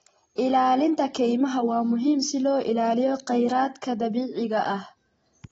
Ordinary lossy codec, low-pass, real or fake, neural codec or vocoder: AAC, 24 kbps; 14.4 kHz; real; none